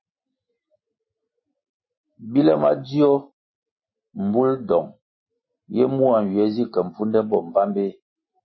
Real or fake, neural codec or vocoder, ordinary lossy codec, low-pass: real; none; MP3, 24 kbps; 7.2 kHz